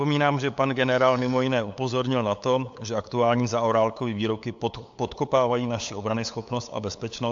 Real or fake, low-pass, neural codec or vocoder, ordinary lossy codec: fake; 7.2 kHz; codec, 16 kHz, 8 kbps, FunCodec, trained on LibriTTS, 25 frames a second; AAC, 64 kbps